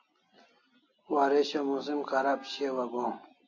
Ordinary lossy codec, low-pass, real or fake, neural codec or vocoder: AAC, 48 kbps; 7.2 kHz; real; none